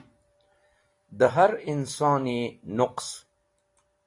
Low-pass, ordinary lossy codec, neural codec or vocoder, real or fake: 10.8 kHz; AAC, 48 kbps; vocoder, 44.1 kHz, 128 mel bands every 256 samples, BigVGAN v2; fake